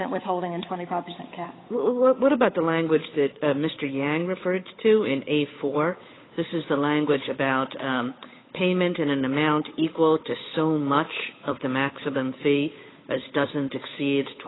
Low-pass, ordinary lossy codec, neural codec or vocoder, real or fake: 7.2 kHz; AAC, 16 kbps; codec, 16 kHz, 8 kbps, FunCodec, trained on Chinese and English, 25 frames a second; fake